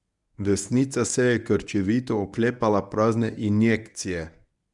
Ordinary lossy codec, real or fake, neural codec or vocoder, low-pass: none; fake; codec, 24 kHz, 0.9 kbps, WavTokenizer, medium speech release version 1; 10.8 kHz